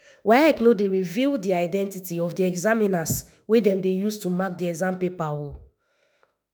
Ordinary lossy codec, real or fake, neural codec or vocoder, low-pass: none; fake; autoencoder, 48 kHz, 32 numbers a frame, DAC-VAE, trained on Japanese speech; none